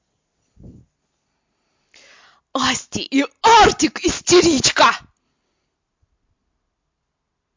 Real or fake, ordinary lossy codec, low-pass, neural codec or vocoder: real; MP3, 48 kbps; 7.2 kHz; none